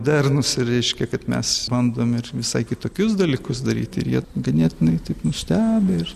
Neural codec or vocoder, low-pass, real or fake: none; 14.4 kHz; real